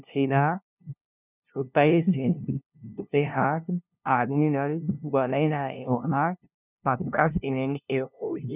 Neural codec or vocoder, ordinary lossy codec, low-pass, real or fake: codec, 16 kHz, 0.5 kbps, FunCodec, trained on LibriTTS, 25 frames a second; none; 3.6 kHz; fake